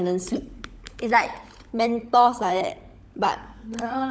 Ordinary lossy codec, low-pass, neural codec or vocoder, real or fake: none; none; codec, 16 kHz, 16 kbps, FunCodec, trained on LibriTTS, 50 frames a second; fake